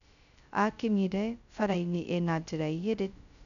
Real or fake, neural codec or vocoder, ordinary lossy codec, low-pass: fake; codec, 16 kHz, 0.2 kbps, FocalCodec; none; 7.2 kHz